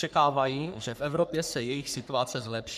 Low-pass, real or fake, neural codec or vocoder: 14.4 kHz; fake; codec, 44.1 kHz, 3.4 kbps, Pupu-Codec